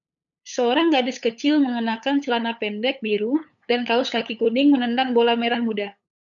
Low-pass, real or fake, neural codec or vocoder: 7.2 kHz; fake; codec, 16 kHz, 8 kbps, FunCodec, trained on LibriTTS, 25 frames a second